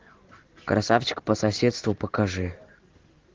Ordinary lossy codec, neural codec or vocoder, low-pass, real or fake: Opus, 16 kbps; none; 7.2 kHz; real